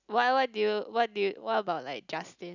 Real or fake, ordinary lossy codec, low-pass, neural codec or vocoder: real; none; 7.2 kHz; none